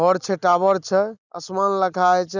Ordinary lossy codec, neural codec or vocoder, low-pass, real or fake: none; none; 7.2 kHz; real